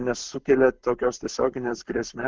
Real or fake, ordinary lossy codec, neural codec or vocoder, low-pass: fake; Opus, 16 kbps; codec, 44.1 kHz, 7.8 kbps, Pupu-Codec; 7.2 kHz